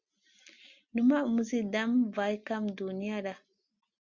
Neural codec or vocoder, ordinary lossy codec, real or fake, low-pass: none; Opus, 64 kbps; real; 7.2 kHz